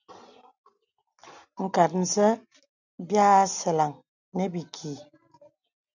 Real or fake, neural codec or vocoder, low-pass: real; none; 7.2 kHz